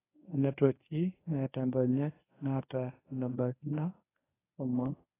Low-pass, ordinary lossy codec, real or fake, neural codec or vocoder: 3.6 kHz; AAC, 16 kbps; fake; codec, 24 kHz, 0.9 kbps, WavTokenizer, medium speech release version 1